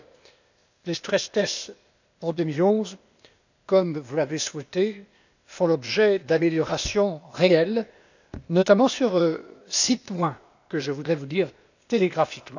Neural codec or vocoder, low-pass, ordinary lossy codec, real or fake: codec, 16 kHz, 0.8 kbps, ZipCodec; 7.2 kHz; none; fake